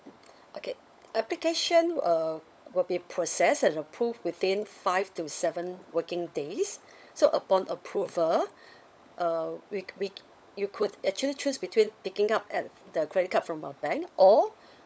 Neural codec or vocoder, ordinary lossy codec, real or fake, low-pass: codec, 16 kHz, 16 kbps, FunCodec, trained on LibriTTS, 50 frames a second; none; fake; none